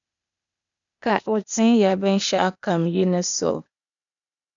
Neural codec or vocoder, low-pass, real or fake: codec, 16 kHz, 0.8 kbps, ZipCodec; 7.2 kHz; fake